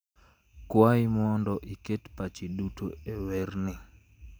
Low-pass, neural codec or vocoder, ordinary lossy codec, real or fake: none; none; none; real